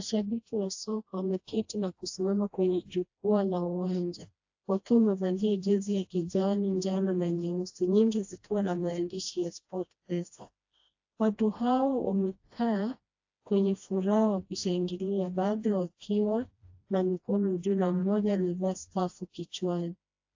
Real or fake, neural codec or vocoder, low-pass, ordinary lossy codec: fake; codec, 16 kHz, 1 kbps, FreqCodec, smaller model; 7.2 kHz; AAC, 48 kbps